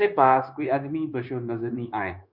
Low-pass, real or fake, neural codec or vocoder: 5.4 kHz; fake; codec, 16 kHz, 0.9 kbps, LongCat-Audio-Codec